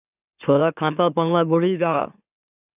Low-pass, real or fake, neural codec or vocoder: 3.6 kHz; fake; autoencoder, 44.1 kHz, a latent of 192 numbers a frame, MeloTTS